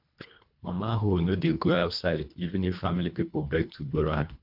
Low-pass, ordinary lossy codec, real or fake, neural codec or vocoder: 5.4 kHz; MP3, 48 kbps; fake; codec, 24 kHz, 1.5 kbps, HILCodec